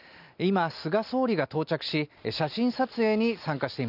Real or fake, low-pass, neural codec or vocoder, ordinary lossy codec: real; 5.4 kHz; none; none